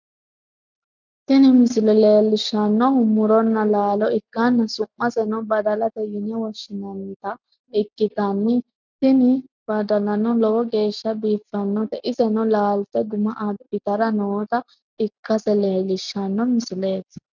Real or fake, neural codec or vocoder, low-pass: real; none; 7.2 kHz